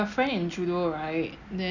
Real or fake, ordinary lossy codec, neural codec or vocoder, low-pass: real; none; none; 7.2 kHz